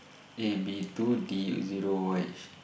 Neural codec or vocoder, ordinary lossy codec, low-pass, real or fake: none; none; none; real